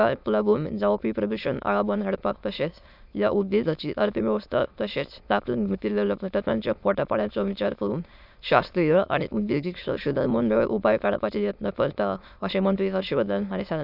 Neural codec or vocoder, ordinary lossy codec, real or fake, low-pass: autoencoder, 22.05 kHz, a latent of 192 numbers a frame, VITS, trained on many speakers; none; fake; 5.4 kHz